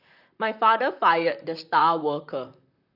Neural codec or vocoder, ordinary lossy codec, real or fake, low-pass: vocoder, 44.1 kHz, 128 mel bands, Pupu-Vocoder; none; fake; 5.4 kHz